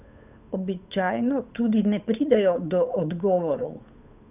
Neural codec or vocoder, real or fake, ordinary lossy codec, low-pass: codec, 16 kHz, 8 kbps, FunCodec, trained on Chinese and English, 25 frames a second; fake; none; 3.6 kHz